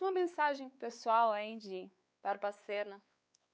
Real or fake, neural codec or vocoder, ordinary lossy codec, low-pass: fake; codec, 16 kHz, 4 kbps, X-Codec, WavLM features, trained on Multilingual LibriSpeech; none; none